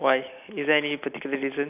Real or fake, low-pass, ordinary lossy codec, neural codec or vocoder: real; 3.6 kHz; none; none